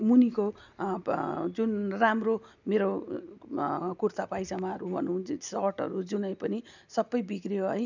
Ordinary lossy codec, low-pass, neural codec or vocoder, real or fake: none; 7.2 kHz; none; real